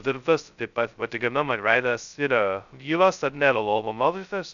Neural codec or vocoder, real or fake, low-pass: codec, 16 kHz, 0.2 kbps, FocalCodec; fake; 7.2 kHz